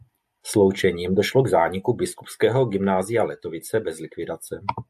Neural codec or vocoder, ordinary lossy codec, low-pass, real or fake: none; AAC, 96 kbps; 14.4 kHz; real